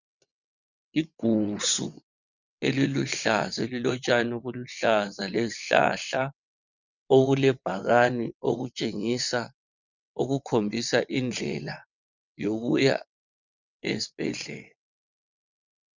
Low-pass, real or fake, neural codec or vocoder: 7.2 kHz; fake; vocoder, 22.05 kHz, 80 mel bands, WaveNeXt